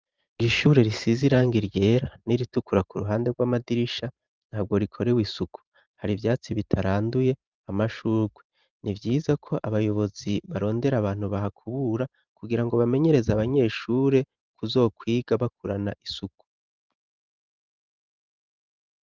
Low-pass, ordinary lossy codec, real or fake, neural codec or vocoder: 7.2 kHz; Opus, 32 kbps; fake; vocoder, 44.1 kHz, 128 mel bands every 512 samples, BigVGAN v2